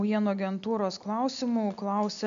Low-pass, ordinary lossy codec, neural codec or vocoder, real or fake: 7.2 kHz; AAC, 64 kbps; none; real